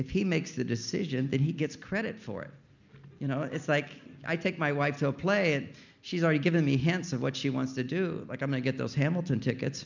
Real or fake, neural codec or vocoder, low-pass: real; none; 7.2 kHz